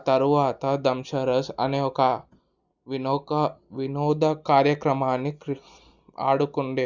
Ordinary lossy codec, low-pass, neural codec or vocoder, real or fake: Opus, 64 kbps; 7.2 kHz; none; real